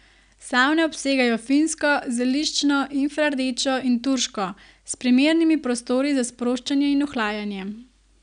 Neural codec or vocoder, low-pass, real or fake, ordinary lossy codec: none; 9.9 kHz; real; none